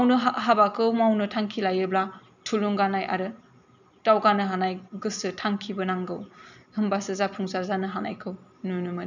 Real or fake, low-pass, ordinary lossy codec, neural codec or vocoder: real; 7.2 kHz; none; none